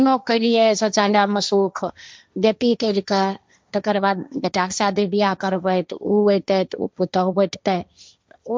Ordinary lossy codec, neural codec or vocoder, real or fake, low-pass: none; codec, 16 kHz, 1.1 kbps, Voila-Tokenizer; fake; none